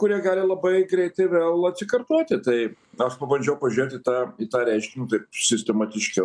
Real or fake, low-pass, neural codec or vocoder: real; 9.9 kHz; none